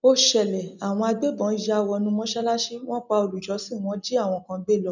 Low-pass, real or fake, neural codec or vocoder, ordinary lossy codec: 7.2 kHz; real; none; none